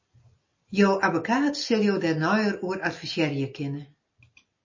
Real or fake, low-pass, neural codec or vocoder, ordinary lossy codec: real; 7.2 kHz; none; MP3, 32 kbps